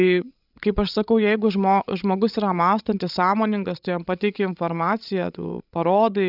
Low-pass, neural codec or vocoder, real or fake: 5.4 kHz; codec, 16 kHz, 16 kbps, FreqCodec, larger model; fake